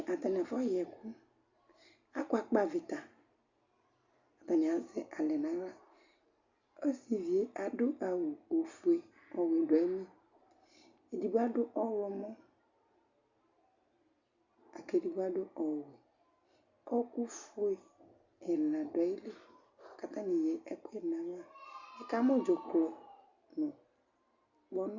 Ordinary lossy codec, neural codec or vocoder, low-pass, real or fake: Opus, 64 kbps; none; 7.2 kHz; real